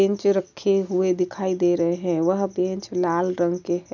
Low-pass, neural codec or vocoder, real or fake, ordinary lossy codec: 7.2 kHz; none; real; none